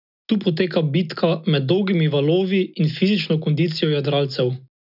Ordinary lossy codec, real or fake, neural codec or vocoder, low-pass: none; real; none; 5.4 kHz